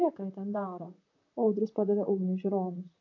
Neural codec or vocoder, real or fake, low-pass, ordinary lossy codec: vocoder, 44.1 kHz, 128 mel bands, Pupu-Vocoder; fake; 7.2 kHz; AAC, 48 kbps